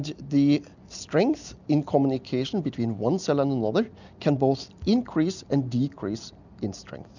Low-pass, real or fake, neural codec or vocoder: 7.2 kHz; real; none